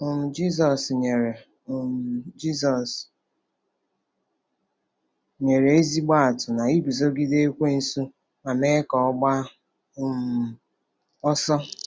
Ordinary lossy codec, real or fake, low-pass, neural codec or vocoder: none; real; none; none